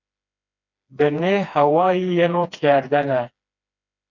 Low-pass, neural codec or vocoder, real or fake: 7.2 kHz; codec, 16 kHz, 2 kbps, FreqCodec, smaller model; fake